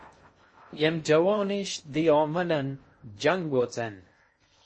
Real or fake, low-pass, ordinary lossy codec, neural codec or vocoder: fake; 9.9 kHz; MP3, 32 kbps; codec, 16 kHz in and 24 kHz out, 0.6 kbps, FocalCodec, streaming, 2048 codes